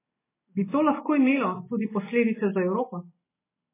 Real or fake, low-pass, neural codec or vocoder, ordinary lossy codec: real; 3.6 kHz; none; MP3, 16 kbps